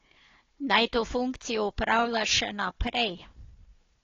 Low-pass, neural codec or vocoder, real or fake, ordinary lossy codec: 7.2 kHz; codec, 16 kHz, 4 kbps, FunCodec, trained on Chinese and English, 50 frames a second; fake; AAC, 32 kbps